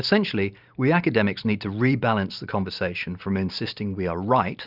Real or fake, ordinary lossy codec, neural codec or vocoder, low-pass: real; Opus, 64 kbps; none; 5.4 kHz